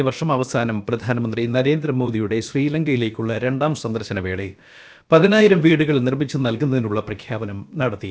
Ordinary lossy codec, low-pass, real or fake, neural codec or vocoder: none; none; fake; codec, 16 kHz, about 1 kbps, DyCAST, with the encoder's durations